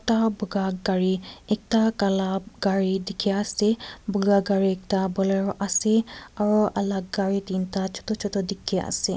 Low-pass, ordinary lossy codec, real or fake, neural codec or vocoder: none; none; real; none